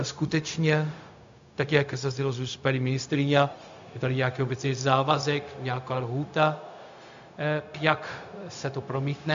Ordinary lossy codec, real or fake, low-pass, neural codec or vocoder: MP3, 64 kbps; fake; 7.2 kHz; codec, 16 kHz, 0.4 kbps, LongCat-Audio-Codec